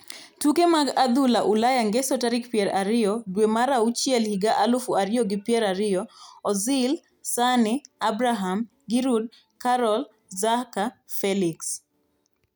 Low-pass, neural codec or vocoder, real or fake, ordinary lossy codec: none; none; real; none